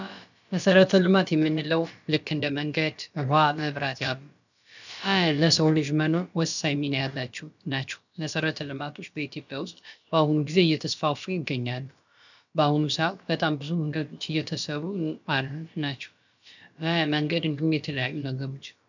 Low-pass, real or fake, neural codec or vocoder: 7.2 kHz; fake; codec, 16 kHz, about 1 kbps, DyCAST, with the encoder's durations